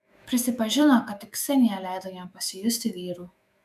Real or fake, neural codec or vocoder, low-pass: fake; autoencoder, 48 kHz, 128 numbers a frame, DAC-VAE, trained on Japanese speech; 14.4 kHz